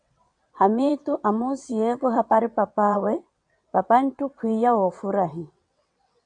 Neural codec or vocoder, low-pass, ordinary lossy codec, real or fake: vocoder, 22.05 kHz, 80 mel bands, WaveNeXt; 9.9 kHz; MP3, 96 kbps; fake